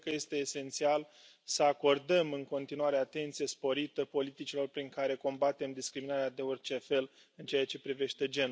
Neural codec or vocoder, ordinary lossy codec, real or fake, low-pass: none; none; real; none